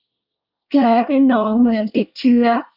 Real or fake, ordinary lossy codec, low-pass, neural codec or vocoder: fake; none; 5.4 kHz; codec, 24 kHz, 1 kbps, SNAC